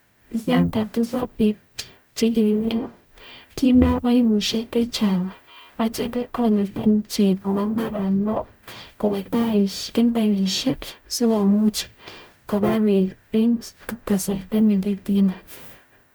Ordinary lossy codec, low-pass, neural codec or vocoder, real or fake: none; none; codec, 44.1 kHz, 0.9 kbps, DAC; fake